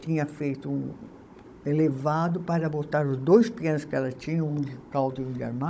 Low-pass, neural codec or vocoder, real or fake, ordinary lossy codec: none; codec, 16 kHz, 16 kbps, FunCodec, trained on Chinese and English, 50 frames a second; fake; none